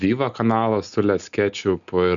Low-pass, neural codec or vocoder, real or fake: 7.2 kHz; none; real